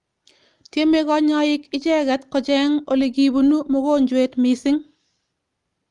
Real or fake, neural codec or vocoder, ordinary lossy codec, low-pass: real; none; Opus, 32 kbps; 10.8 kHz